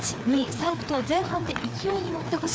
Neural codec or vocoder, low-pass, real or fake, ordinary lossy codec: codec, 16 kHz, 4 kbps, FreqCodec, larger model; none; fake; none